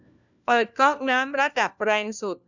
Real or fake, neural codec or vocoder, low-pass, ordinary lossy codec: fake; codec, 16 kHz, 1 kbps, FunCodec, trained on LibriTTS, 50 frames a second; 7.2 kHz; none